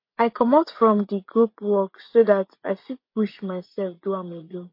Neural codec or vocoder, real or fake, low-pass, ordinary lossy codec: vocoder, 24 kHz, 100 mel bands, Vocos; fake; 5.4 kHz; MP3, 32 kbps